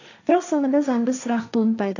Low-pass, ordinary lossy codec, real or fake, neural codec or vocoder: 7.2 kHz; none; fake; codec, 16 kHz, 1.1 kbps, Voila-Tokenizer